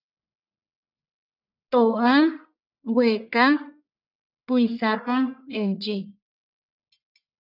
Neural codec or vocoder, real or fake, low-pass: codec, 44.1 kHz, 1.7 kbps, Pupu-Codec; fake; 5.4 kHz